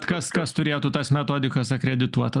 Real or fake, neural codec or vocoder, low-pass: real; none; 10.8 kHz